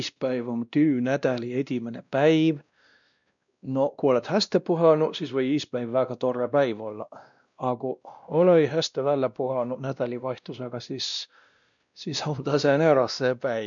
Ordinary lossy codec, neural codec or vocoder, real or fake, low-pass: none; codec, 16 kHz, 1 kbps, X-Codec, WavLM features, trained on Multilingual LibriSpeech; fake; 7.2 kHz